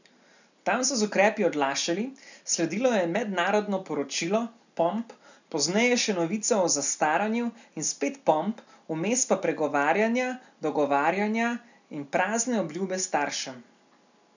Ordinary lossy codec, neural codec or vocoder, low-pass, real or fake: none; none; 7.2 kHz; real